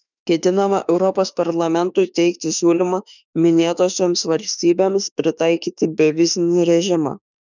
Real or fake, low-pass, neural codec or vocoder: fake; 7.2 kHz; autoencoder, 48 kHz, 32 numbers a frame, DAC-VAE, trained on Japanese speech